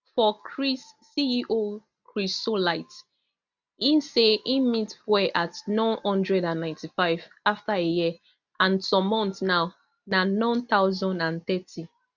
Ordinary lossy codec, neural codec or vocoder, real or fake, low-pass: none; none; real; 7.2 kHz